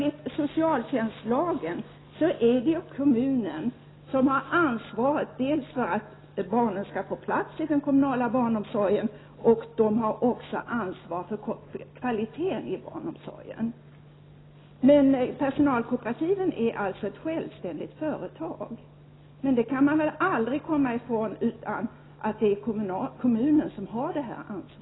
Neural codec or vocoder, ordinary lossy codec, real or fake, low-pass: none; AAC, 16 kbps; real; 7.2 kHz